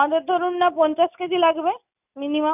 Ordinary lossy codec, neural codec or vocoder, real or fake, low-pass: none; none; real; 3.6 kHz